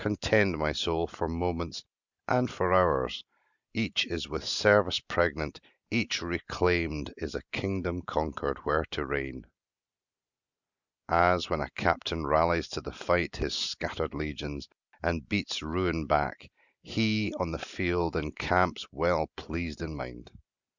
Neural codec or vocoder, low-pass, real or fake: none; 7.2 kHz; real